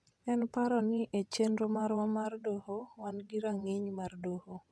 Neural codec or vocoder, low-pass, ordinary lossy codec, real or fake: vocoder, 22.05 kHz, 80 mel bands, WaveNeXt; none; none; fake